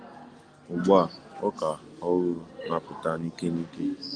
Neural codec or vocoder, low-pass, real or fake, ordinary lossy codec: none; 9.9 kHz; real; Opus, 32 kbps